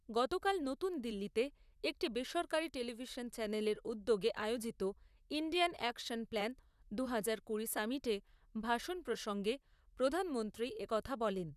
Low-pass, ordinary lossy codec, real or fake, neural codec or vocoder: 14.4 kHz; none; fake; vocoder, 44.1 kHz, 128 mel bands, Pupu-Vocoder